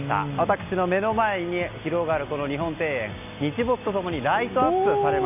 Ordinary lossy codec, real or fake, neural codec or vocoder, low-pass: none; real; none; 3.6 kHz